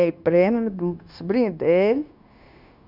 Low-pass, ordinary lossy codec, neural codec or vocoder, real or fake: 5.4 kHz; none; codec, 16 kHz, 0.9 kbps, LongCat-Audio-Codec; fake